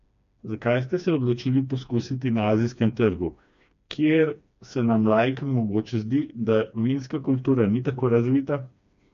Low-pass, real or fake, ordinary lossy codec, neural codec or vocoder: 7.2 kHz; fake; MP3, 64 kbps; codec, 16 kHz, 2 kbps, FreqCodec, smaller model